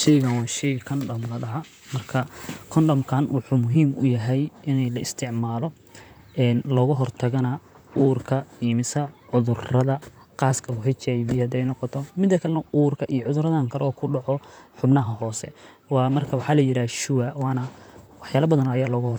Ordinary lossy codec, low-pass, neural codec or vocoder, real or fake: none; none; vocoder, 44.1 kHz, 128 mel bands, Pupu-Vocoder; fake